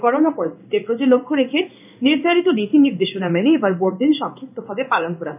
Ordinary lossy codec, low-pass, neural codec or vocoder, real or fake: none; 3.6 kHz; codec, 16 kHz in and 24 kHz out, 1 kbps, XY-Tokenizer; fake